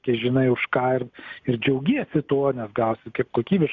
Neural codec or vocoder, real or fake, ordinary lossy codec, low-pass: none; real; Opus, 64 kbps; 7.2 kHz